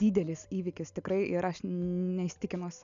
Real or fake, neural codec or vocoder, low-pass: real; none; 7.2 kHz